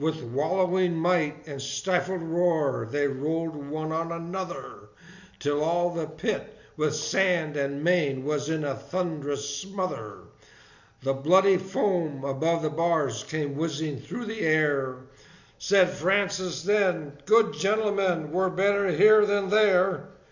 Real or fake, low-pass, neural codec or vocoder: real; 7.2 kHz; none